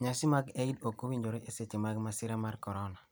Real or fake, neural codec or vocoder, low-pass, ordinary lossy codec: real; none; none; none